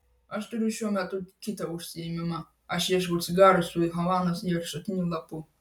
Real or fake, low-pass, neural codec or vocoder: real; 19.8 kHz; none